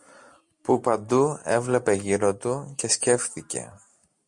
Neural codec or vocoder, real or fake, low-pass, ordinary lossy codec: none; real; 10.8 kHz; MP3, 48 kbps